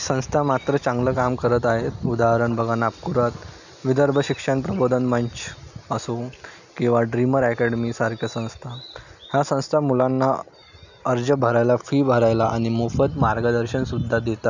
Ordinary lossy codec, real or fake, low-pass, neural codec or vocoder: none; real; 7.2 kHz; none